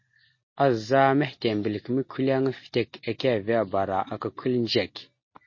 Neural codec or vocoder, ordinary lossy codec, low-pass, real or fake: none; MP3, 32 kbps; 7.2 kHz; real